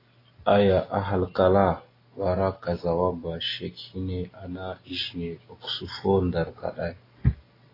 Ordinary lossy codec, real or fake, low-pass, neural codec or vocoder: AAC, 24 kbps; real; 5.4 kHz; none